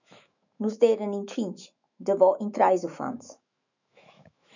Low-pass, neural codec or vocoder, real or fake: 7.2 kHz; autoencoder, 48 kHz, 128 numbers a frame, DAC-VAE, trained on Japanese speech; fake